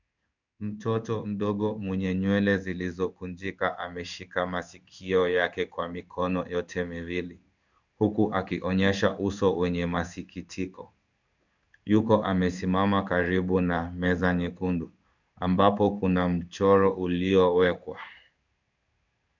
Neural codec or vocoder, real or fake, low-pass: codec, 16 kHz in and 24 kHz out, 1 kbps, XY-Tokenizer; fake; 7.2 kHz